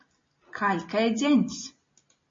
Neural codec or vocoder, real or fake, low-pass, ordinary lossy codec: none; real; 7.2 kHz; MP3, 32 kbps